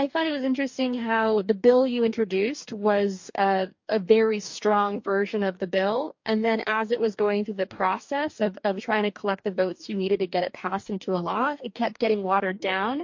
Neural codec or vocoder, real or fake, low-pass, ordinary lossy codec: codec, 44.1 kHz, 2.6 kbps, DAC; fake; 7.2 kHz; MP3, 48 kbps